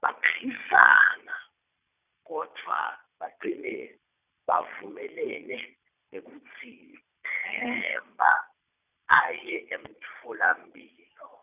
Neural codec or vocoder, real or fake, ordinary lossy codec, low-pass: vocoder, 22.05 kHz, 80 mel bands, Vocos; fake; none; 3.6 kHz